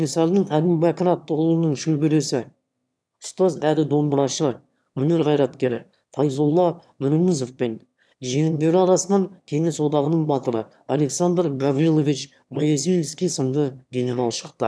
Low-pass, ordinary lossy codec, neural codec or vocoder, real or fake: none; none; autoencoder, 22.05 kHz, a latent of 192 numbers a frame, VITS, trained on one speaker; fake